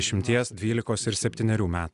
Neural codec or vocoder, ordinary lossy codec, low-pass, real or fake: none; Opus, 64 kbps; 10.8 kHz; real